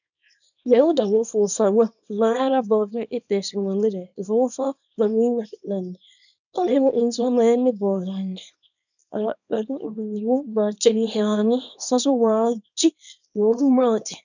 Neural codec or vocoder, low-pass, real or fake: codec, 24 kHz, 0.9 kbps, WavTokenizer, small release; 7.2 kHz; fake